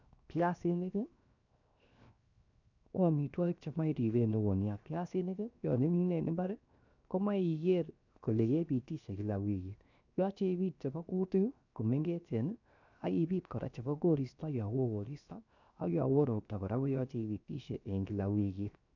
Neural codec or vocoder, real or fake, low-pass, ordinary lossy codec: codec, 16 kHz, 0.7 kbps, FocalCodec; fake; 7.2 kHz; none